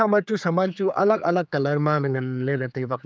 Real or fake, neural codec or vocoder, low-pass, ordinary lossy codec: fake; codec, 16 kHz, 4 kbps, X-Codec, HuBERT features, trained on general audio; none; none